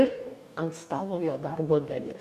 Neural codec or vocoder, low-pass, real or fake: codec, 44.1 kHz, 2.6 kbps, DAC; 14.4 kHz; fake